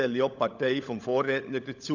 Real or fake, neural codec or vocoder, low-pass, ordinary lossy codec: fake; codec, 16 kHz, 16 kbps, FunCodec, trained on LibriTTS, 50 frames a second; 7.2 kHz; none